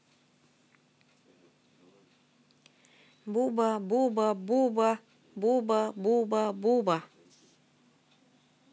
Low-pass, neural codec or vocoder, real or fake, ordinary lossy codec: none; none; real; none